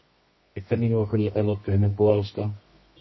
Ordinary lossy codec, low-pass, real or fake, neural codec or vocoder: MP3, 24 kbps; 7.2 kHz; fake; codec, 24 kHz, 0.9 kbps, WavTokenizer, medium music audio release